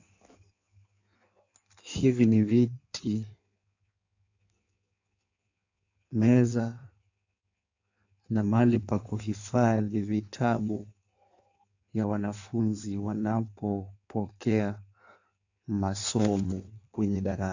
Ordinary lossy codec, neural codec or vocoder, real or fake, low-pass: AAC, 48 kbps; codec, 16 kHz in and 24 kHz out, 1.1 kbps, FireRedTTS-2 codec; fake; 7.2 kHz